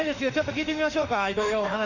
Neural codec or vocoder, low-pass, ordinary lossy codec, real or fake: autoencoder, 48 kHz, 32 numbers a frame, DAC-VAE, trained on Japanese speech; 7.2 kHz; none; fake